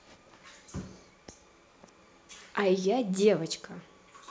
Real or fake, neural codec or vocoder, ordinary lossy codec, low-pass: real; none; none; none